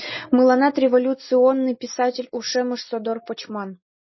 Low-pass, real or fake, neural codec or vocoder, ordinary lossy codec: 7.2 kHz; real; none; MP3, 24 kbps